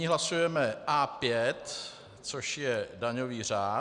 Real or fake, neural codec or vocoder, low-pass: real; none; 10.8 kHz